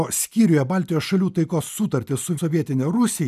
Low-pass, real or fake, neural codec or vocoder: 14.4 kHz; real; none